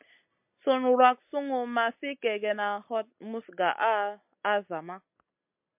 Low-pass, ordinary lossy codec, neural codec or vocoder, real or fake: 3.6 kHz; MP3, 32 kbps; none; real